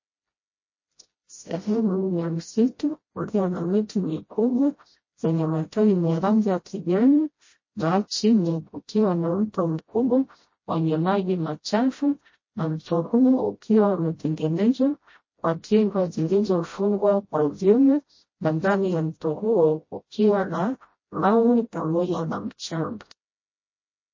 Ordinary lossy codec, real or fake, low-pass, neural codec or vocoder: MP3, 32 kbps; fake; 7.2 kHz; codec, 16 kHz, 0.5 kbps, FreqCodec, smaller model